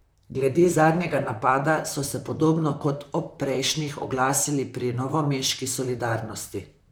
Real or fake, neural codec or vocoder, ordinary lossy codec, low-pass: fake; vocoder, 44.1 kHz, 128 mel bands, Pupu-Vocoder; none; none